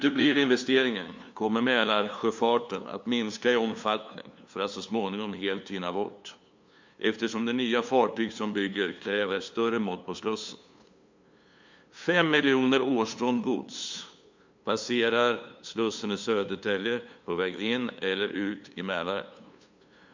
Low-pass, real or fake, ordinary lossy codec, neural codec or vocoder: 7.2 kHz; fake; MP3, 64 kbps; codec, 16 kHz, 2 kbps, FunCodec, trained on LibriTTS, 25 frames a second